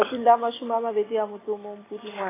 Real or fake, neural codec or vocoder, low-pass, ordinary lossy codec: real; none; 3.6 kHz; AAC, 16 kbps